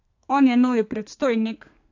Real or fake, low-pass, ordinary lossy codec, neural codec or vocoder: fake; 7.2 kHz; AAC, 48 kbps; codec, 32 kHz, 1.9 kbps, SNAC